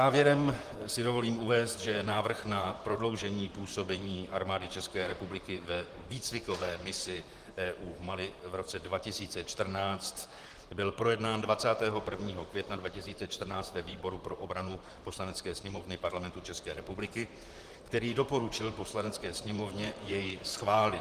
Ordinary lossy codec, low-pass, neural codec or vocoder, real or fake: Opus, 24 kbps; 14.4 kHz; vocoder, 44.1 kHz, 128 mel bands, Pupu-Vocoder; fake